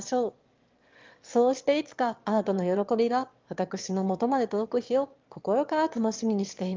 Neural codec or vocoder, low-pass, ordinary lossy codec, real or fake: autoencoder, 22.05 kHz, a latent of 192 numbers a frame, VITS, trained on one speaker; 7.2 kHz; Opus, 32 kbps; fake